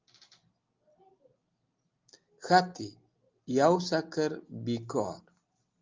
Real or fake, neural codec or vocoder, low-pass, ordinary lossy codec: real; none; 7.2 kHz; Opus, 32 kbps